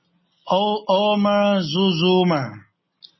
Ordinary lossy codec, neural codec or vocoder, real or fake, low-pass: MP3, 24 kbps; none; real; 7.2 kHz